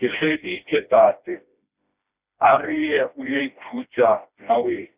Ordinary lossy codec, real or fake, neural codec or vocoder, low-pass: Opus, 64 kbps; fake; codec, 16 kHz, 1 kbps, FreqCodec, smaller model; 3.6 kHz